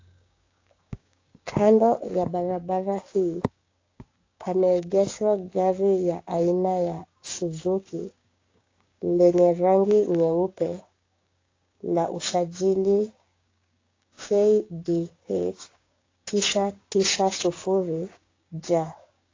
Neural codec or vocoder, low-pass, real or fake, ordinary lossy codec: codec, 16 kHz, 6 kbps, DAC; 7.2 kHz; fake; AAC, 32 kbps